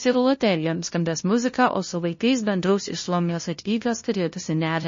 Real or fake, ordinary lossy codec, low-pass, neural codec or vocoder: fake; MP3, 32 kbps; 7.2 kHz; codec, 16 kHz, 0.5 kbps, FunCodec, trained on LibriTTS, 25 frames a second